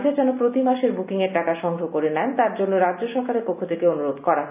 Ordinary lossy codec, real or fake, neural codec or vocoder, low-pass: none; real; none; 3.6 kHz